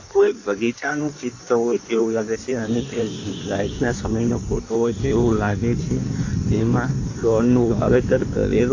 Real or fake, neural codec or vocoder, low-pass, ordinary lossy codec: fake; codec, 16 kHz in and 24 kHz out, 1.1 kbps, FireRedTTS-2 codec; 7.2 kHz; AAC, 48 kbps